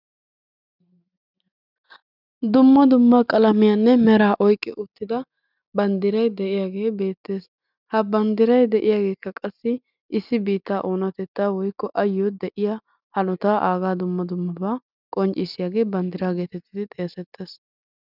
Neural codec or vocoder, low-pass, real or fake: none; 5.4 kHz; real